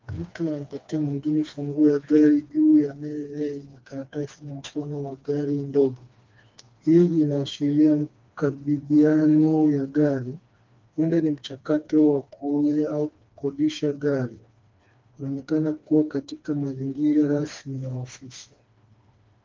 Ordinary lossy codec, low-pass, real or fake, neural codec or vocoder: Opus, 24 kbps; 7.2 kHz; fake; codec, 16 kHz, 2 kbps, FreqCodec, smaller model